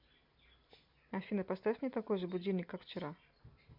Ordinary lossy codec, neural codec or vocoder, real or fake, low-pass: MP3, 48 kbps; none; real; 5.4 kHz